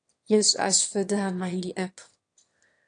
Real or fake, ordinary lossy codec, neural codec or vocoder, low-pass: fake; AAC, 48 kbps; autoencoder, 22.05 kHz, a latent of 192 numbers a frame, VITS, trained on one speaker; 9.9 kHz